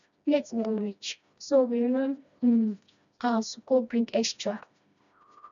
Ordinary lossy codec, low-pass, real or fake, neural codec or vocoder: none; 7.2 kHz; fake; codec, 16 kHz, 1 kbps, FreqCodec, smaller model